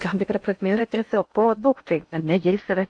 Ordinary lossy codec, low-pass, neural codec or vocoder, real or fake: AAC, 48 kbps; 9.9 kHz; codec, 16 kHz in and 24 kHz out, 0.6 kbps, FocalCodec, streaming, 2048 codes; fake